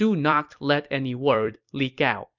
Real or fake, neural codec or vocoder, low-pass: fake; vocoder, 44.1 kHz, 128 mel bands every 256 samples, BigVGAN v2; 7.2 kHz